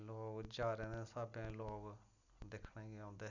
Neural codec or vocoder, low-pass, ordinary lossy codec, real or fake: none; 7.2 kHz; none; real